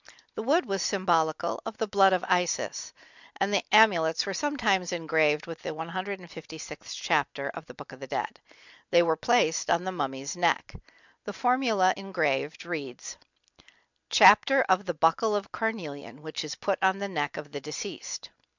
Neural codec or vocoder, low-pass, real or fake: none; 7.2 kHz; real